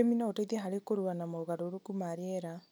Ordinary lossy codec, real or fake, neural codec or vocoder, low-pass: none; real; none; none